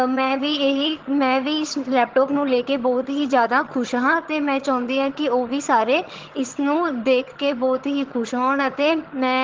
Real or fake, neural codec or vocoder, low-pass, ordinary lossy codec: fake; vocoder, 22.05 kHz, 80 mel bands, HiFi-GAN; 7.2 kHz; Opus, 16 kbps